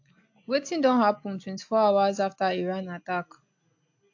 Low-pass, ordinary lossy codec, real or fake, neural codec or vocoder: 7.2 kHz; AAC, 48 kbps; real; none